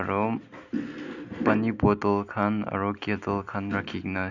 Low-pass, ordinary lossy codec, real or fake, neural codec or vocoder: 7.2 kHz; none; real; none